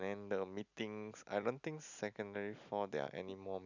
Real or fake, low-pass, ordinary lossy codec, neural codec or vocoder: real; 7.2 kHz; none; none